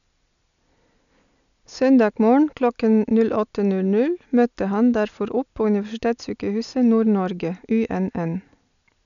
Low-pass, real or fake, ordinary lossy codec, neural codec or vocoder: 7.2 kHz; real; none; none